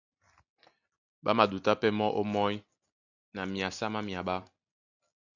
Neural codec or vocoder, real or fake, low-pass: none; real; 7.2 kHz